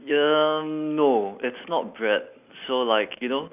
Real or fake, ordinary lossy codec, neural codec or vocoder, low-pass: real; none; none; 3.6 kHz